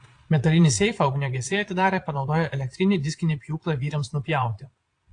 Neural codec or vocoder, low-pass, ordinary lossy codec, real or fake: vocoder, 22.05 kHz, 80 mel bands, Vocos; 9.9 kHz; AAC, 48 kbps; fake